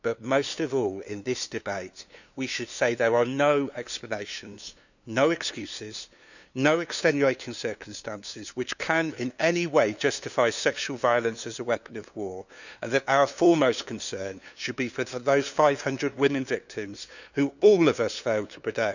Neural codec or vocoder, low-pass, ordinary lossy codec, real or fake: codec, 16 kHz, 2 kbps, FunCodec, trained on LibriTTS, 25 frames a second; 7.2 kHz; none; fake